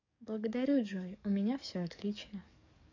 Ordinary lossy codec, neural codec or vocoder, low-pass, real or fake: none; codec, 44.1 kHz, 7.8 kbps, DAC; 7.2 kHz; fake